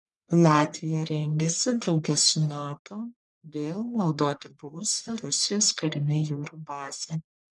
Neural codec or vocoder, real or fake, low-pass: codec, 44.1 kHz, 1.7 kbps, Pupu-Codec; fake; 10.8 kHz